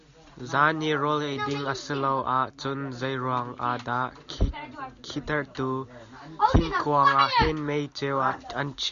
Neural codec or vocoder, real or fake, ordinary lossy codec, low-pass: none; real; Opus, 64 kbps; 7.2 kHz